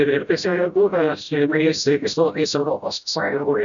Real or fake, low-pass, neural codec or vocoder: fake; 7.2 kHz; codec, 16 kHz, 0.5 kbps, FreqCodec, smaller model